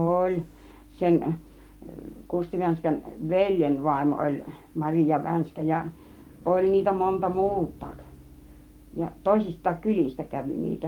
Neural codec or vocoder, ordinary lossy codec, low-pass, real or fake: codec, 44.1 kHz, 7.8 kbps, Pupu-Codec; Opus, 32 kbps; 19.8 kHz; fake